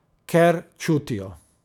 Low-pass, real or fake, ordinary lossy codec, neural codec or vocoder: 19.8 kHz; fake; none; autoencoder, 48 kHz, 128 numbers a frame, DAC-VAE, trained on Japanese speech